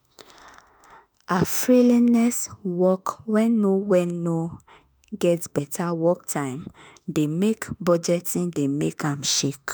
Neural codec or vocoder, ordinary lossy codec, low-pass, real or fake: autoencoder, 48 kHz, 32 numbers a frame, DAC-VAE, trained on Japanese speech; none; none; fake